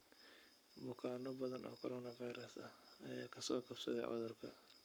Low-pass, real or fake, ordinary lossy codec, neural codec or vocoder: none; fake; none; codec, 44.1 kHz, 7.8 kbps, Pupu-Codec